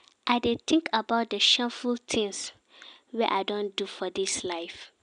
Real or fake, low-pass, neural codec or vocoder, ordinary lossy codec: real; 9.9 kHz; none; MP3, 96 kbps